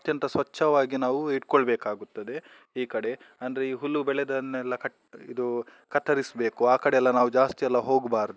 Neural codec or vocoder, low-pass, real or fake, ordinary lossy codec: none; none; real; none